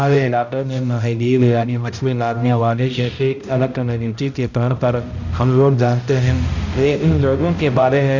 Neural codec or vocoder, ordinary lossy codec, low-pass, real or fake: codec, 16 kHz, 0.5 kbps, X-Codec, HuBERT features, trained on balanced general audio; Opus, 64 kbps; 7.2 kHz; fake